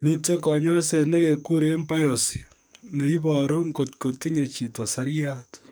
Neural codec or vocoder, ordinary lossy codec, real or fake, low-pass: codec, 44.1 kHz, 2.6 kbps, SNAC; none; fake; none